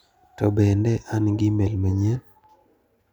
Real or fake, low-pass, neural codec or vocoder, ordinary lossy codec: real; 19.8 kHz; none; none